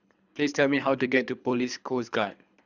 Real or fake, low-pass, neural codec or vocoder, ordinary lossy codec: fake; 7.2 kHz; codec, 24 kHz, 3 kbps, HILCodec; none